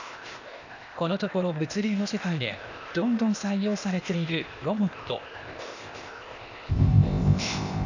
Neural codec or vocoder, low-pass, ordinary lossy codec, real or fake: codec, 16 kHz, 0.8 kbps, ZipCodec; 7.2 kHz; none; fake